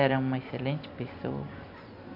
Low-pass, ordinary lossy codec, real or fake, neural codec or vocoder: 5.4 kHz; none; real; none